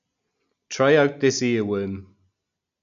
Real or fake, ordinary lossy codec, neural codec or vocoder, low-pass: real; none; none; 7.2 kHz